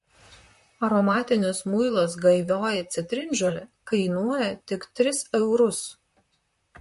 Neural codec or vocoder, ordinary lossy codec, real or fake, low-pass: vocoder, 44.1 kHz, 128 mel bands, Pupu-Vocoder; MP3, 48 kbps; fake; 14.4 kHz